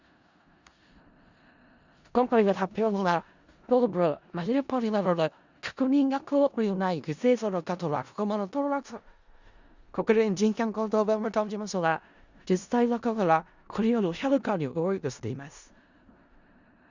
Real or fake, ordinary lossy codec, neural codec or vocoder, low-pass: fake; Opus, 64 kbps; codec, 16 kHz in and 24 kHz out, 0.4 kbps, LongCat-Audio-Codec, four codebook decoder; 7.2 kHz